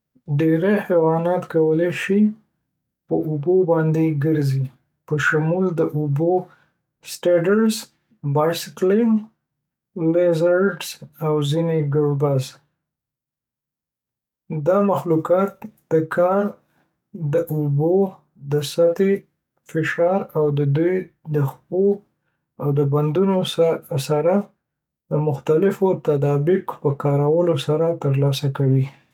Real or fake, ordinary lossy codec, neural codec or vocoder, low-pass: fake; none; codec, 44.1 kHz, 7.8 kbps, DAC; 19.8 kHz